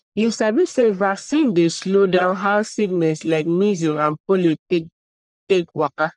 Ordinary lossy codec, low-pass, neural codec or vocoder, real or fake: none; 10.8 kHz; codec, 44.1 kHz, 1.7 kbps, Pupu-Codec; fake